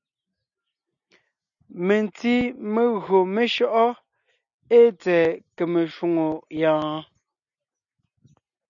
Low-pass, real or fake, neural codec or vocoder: 7.2 kHz; real; none